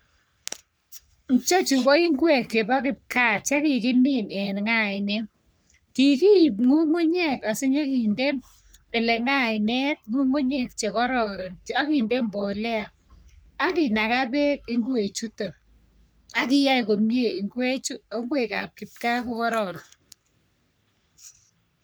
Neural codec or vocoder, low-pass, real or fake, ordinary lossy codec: codec, 44.1 kHz, 3.4 kbps, Pupu-Codec; none; fake; none